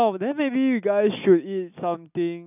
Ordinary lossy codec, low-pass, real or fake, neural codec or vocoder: none; 3.6 kHz; real; none